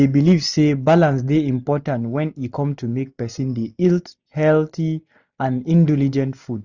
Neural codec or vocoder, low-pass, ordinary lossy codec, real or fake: none; 7.2 kHz; none; real